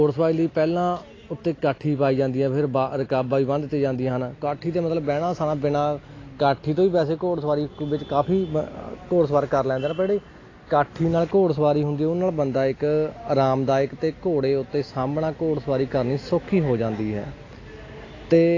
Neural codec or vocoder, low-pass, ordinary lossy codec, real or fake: none; 7.2 kHz; AAC, 32 kbps; real